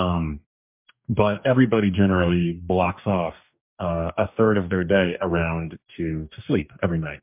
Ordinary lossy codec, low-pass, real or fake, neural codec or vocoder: MP3, 32 kbps; 3.6 kHz; fake; codec, 44.1 kHz, 2.6 kbps, DAC